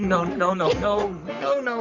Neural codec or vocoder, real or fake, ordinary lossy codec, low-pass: codec, 44.1 kHz, 3.4 kbps, Pupu-Codec; fake; Opus, 64 kbps; 7.2 kHz